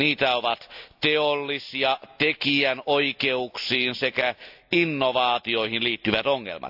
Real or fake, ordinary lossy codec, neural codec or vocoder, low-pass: real; AAC, 48 kbps; none; 5.4 kHz